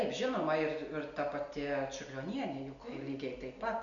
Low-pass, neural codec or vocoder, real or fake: 7.2 kHz; none; real